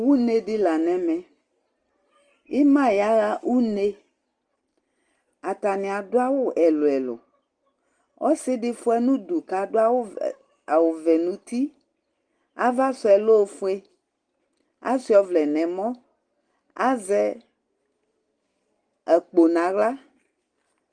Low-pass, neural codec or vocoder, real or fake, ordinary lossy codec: 9.9 kHz; none; real; Opus, 24 kbps